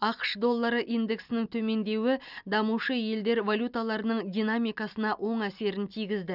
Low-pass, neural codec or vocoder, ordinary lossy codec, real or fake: 5.4 kHz; none; none; real